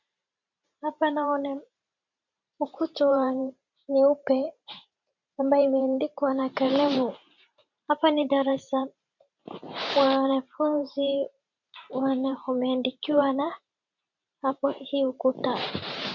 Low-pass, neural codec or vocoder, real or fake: 7.2 kHz; vocoder, 44.1 kHz, 128 mel bands every 512 samples, BigVGAN v2; fake